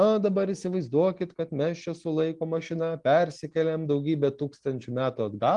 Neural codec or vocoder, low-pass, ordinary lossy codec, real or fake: none; 10.8 kHz; Opus, 24 kbps; real